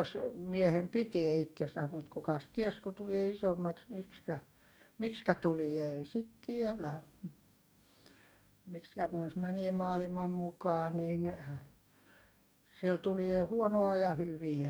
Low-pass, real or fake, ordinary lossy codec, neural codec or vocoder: none; fake; none; codec, 44.1 kHz, 2.6 kbps, DAC